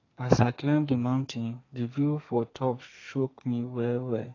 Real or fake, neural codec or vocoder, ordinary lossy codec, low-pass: fake; codec, 32 kHz, 1.9 kbps, SNAC; AAC, 32 kbps; 7.2 kHz